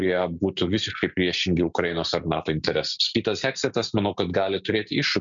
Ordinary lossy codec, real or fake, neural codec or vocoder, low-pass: MP3, 64 kbps; real; none; 7.2 kHz